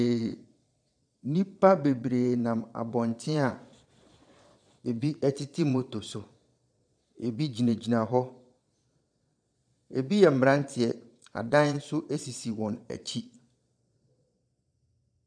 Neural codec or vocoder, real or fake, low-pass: vocoder, 22.05 kHz, 80 mel bands, Vocos; fake; 9.9 kHz